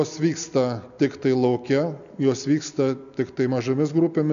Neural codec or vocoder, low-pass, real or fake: none; 7.2 kHz; real